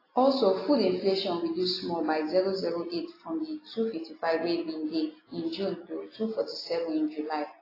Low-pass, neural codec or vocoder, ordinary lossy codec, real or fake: 5.4 kHz; none; AAC, 24 kbps; real